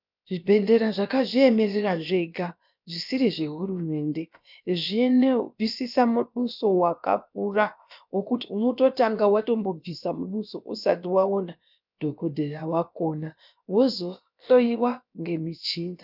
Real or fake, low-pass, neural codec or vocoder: fake; 5.4 kHz; codec, 16 kHz, about 1 kbps, DyCAST, with the encoder's durations